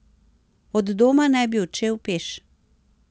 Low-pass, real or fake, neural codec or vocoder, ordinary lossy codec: none; real; none; none